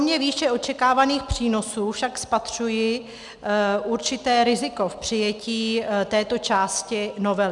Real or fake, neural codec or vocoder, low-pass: real; none; 10.8 kHz